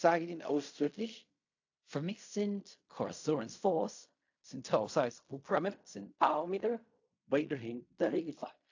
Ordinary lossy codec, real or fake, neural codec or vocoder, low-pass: AAC, 48 kbps; fake; codec, 16 kHz in and 24 kHz out, 0.4 kbps, LongCat-Audio-Codec, fine tuned four codebook decoder; 7.2 kHz